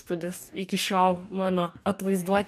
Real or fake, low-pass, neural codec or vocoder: fake; 14.4 kHz; codec, 44.1 kHz, 2.6 kbps, DAC